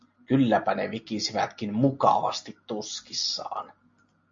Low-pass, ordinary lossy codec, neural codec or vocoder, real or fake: 7.2 kHz; MP3, 48 kbps; none; real